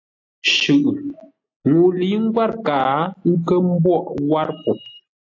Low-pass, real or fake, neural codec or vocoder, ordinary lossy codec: 7.2 kHz; real; none; AAC, 48 kbps